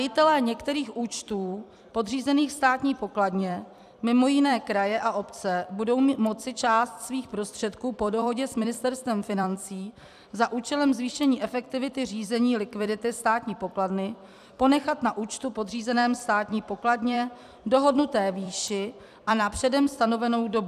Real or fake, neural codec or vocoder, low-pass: fake; vocoder, 44.1 kHz, 128 mel bands every 256 samples, BigVGAN v2; 14.4 kHz